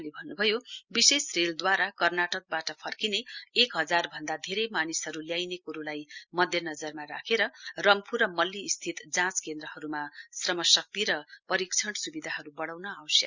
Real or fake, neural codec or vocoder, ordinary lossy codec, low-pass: real; none; Opus, 64 kbps; 7.2 kHz